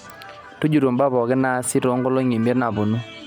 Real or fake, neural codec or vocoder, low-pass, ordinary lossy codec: real; none; 19.8 kHz; none